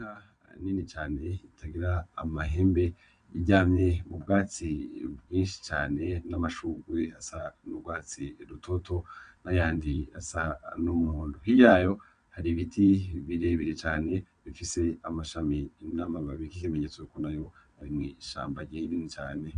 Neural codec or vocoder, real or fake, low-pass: vocoder, 22.05 kHz, 80 mel bands, WaveNeXt; fake; 9.9 kHz